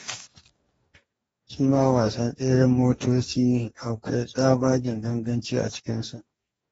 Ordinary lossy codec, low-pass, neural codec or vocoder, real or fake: AAC, 24 kbps; 19.8 kHz; codec, 44.1 kHz, 2.6 kbps, DAC; fake